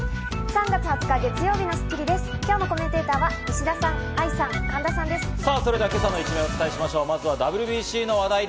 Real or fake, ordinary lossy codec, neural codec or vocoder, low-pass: real; none; none; none